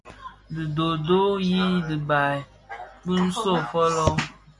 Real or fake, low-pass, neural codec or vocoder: real; 10.8 kHz; none